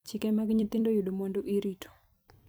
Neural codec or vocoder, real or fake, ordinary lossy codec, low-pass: vocoder, 44.1 kHz, 128 mel bands every 256 samples, BigVGAN v2; fake; none; none